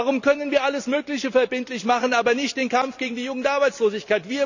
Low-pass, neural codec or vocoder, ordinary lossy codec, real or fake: 7.2 kHz; none; none; real